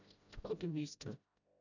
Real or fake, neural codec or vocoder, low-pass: fake; codec, 16 kHz, 0.5 kbps, FreqCodec, smaller model; 7.2 kHz